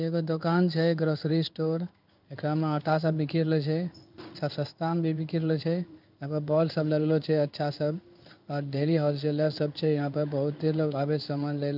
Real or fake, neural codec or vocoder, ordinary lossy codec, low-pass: fake; codec, 16 kHz in and 24 kHz out, 1 kbps, XY-Tokenizer; none; 5.4 kHz